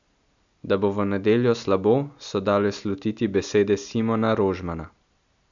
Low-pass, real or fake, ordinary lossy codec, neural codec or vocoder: 7.2 kHz; real; none; none